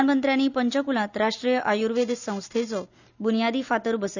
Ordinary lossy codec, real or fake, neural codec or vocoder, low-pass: none; real; none; 7.2 kHz